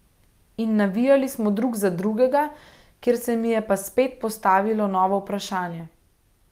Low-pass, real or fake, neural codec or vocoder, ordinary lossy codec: 14.4 kHz; real; none; Opus, 32 kbps